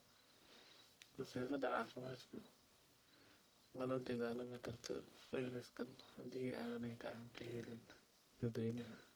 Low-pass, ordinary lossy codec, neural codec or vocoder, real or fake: none; none; codec, 44.1 kHz, 1.7 kbps, Pupu-Codec; fake